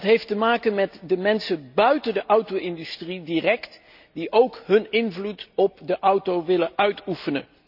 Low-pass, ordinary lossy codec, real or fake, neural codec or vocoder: 5.4 kHz; none; real; none